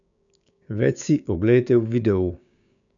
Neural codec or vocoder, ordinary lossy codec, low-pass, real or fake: codec, 16 kHz, 6 kbps, DAC; none; 7.2 kHz; fake